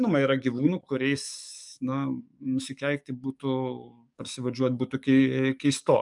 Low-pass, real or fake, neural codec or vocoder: 10.8 kHz; fake; codec, 44.1 kHz, 7.8 kbps, DAC